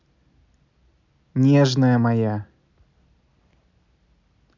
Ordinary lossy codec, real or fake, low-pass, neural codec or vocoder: none; real; 7.2 kHz; none